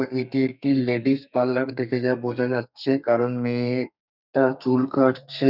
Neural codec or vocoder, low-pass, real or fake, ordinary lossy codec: codec, 32 kHz, 1.9 kbps, SNAC; 5.4 kHz; fake; none